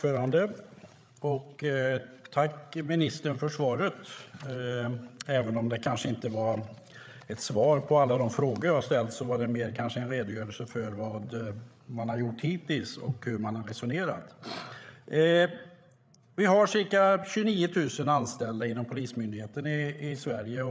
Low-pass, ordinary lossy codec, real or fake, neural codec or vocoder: none; none; fake; codec, 16 kHz, 8 kbps, FreqCodec, larger model